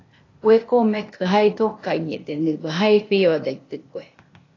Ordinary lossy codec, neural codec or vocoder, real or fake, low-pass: AAC, 32 kbps; codec, 16 kHz, 0.8 kbps, ZipCodec; fake; 7.2 kHz